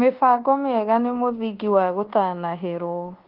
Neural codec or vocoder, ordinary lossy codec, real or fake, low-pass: codec, 24 kHz, 1.2 kbps, DualCodec; Opus, 16 kbps; fake; 5.4 kHz